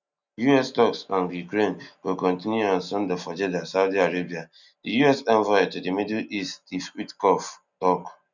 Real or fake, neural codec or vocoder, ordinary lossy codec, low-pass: real; none; none; 7.2 kHz